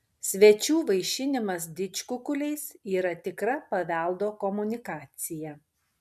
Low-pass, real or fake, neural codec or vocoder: 14.4 kHz; real; none